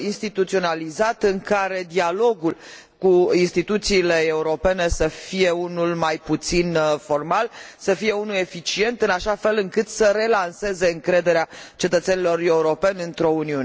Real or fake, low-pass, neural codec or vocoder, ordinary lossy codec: real; none; none; none